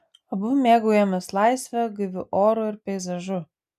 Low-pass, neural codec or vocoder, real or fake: 14.4 kHz; none; real